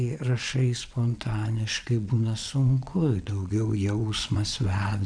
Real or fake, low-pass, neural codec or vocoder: fake; 9.9 kHz; vocoder, 22.05 kHz, 80 mel bands, WaveNeXt